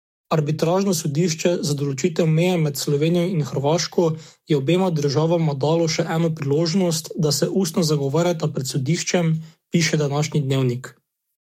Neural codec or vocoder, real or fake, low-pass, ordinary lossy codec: codec, 44.1 kHz, 7.8 kbps, DAC; fake; 19.8 kHz; MP3, 64 kbps